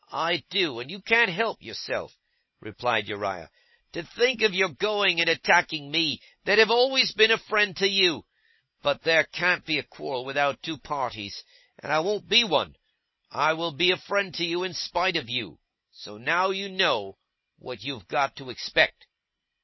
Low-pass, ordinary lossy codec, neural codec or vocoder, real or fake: 7.2 kHz; MP3, 24 kbps; none; real